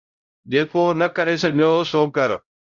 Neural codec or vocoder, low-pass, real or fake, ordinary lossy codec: codec, 16 kHz, 0.5 kbps, X-Codec, HuBERT features, trained on LibriSpeech; 7.2 kHz; fake; Opus, 64 kbps